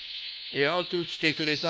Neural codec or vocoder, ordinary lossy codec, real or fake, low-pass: codec, 16 kHz, 1 kbps, FunCodec, trained on LibriTTS, 50 frames a second; none; fake; none